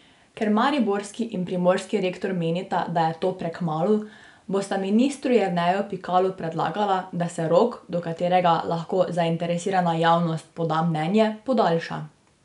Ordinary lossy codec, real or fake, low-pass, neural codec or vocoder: none; real; 10.8 kHz; none